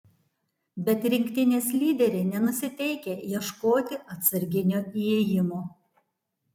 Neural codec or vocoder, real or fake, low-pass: vocoder, 44.1 kHz, 128 mel bands every 256 samples, BigVGAN v2; fake; 19.8 kHz